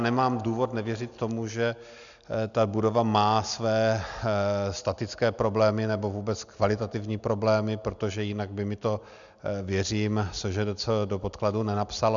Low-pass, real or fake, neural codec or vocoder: 7.2 kHz; real; none